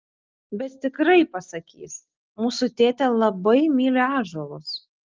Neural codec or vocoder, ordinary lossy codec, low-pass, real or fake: none; Opus, 32 kbps; 7.2 kHz; real